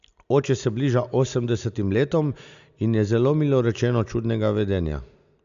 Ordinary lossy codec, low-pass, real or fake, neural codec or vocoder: AAC, 96 kbps; 7.2 kHz; real; none